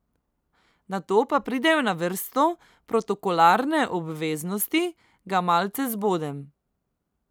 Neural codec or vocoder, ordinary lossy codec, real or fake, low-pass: none; none; real; none